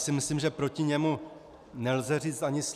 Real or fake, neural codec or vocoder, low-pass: real; none; 14.4 kHz